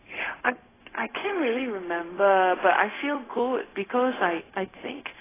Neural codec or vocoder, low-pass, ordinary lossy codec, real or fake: codec, 16 kHz, 0.4 kbps, LongCat-Audio-Codec; 3.6 kHz; AAC, 16 kbps; fake